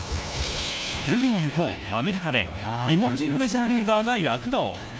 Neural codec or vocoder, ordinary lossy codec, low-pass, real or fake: codec, 16 kHz, 1 kbps, FunCodec, trained on LibriTTS, 50 frames a second; none; none; fake